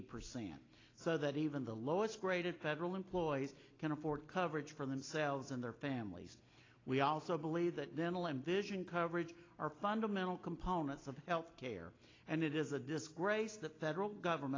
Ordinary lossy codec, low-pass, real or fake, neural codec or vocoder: AAC, 32 kbps; 7.2 kHz; real; none